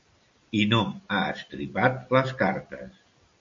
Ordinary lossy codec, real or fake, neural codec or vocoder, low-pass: MP3, 48 kbps; real; none; 7.2 kHz